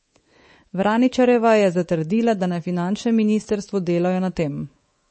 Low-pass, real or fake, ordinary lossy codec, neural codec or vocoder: 10.8 kHz; fake; MP3, 32 kbps; codec, 24 kHz, 3.1 kbps, DualCodec